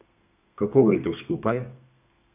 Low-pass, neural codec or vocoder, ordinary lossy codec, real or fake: 3.6 kHz; codec, 32 kHz, 1.9 kbps, SNAC; none; fake